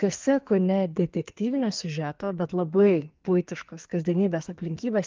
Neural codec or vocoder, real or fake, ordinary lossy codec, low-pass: codec, 44.1 kHz, 2.6 kbps, SNAC; fake; Opus, 24 kbps; 7.2 kHz